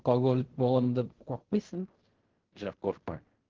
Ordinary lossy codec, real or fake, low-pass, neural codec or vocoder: Opus, 16 kbps; fake; 7.2 kHz; codec, 16 kHz in and 24 kHz out, 0.4 kbps, LongCat-Audio-Codec, fine tuned four codebook decoder